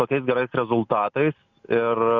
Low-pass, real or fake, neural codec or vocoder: 7.2 kHz; real; none